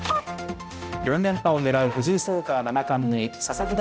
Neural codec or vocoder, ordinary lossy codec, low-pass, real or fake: codec, 16 kHz, 0.5 kbps, X-Codec, HuBERT features, trained on balanced general audio; none; none; fake